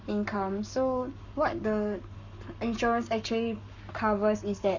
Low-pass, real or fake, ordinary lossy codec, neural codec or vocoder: 7.2 kHz; fake; none; codec, 44.1 kHz, 7.8 kbps, Pupu-Codec